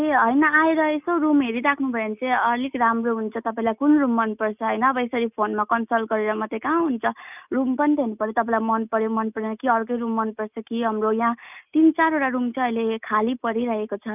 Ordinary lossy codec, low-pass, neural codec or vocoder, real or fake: none; 3.6 kHz; none; real